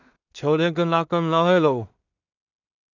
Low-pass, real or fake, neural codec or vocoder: 7.2 kHz; fake; codec, 16 kHz in and 24 kHz out, 0.4 kbps, LongCat-Audio-Codec, two codebook decoder